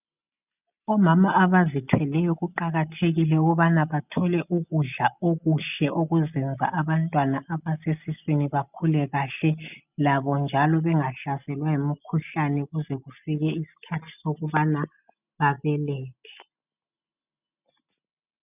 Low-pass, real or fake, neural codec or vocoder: 3.6 kHz; real; none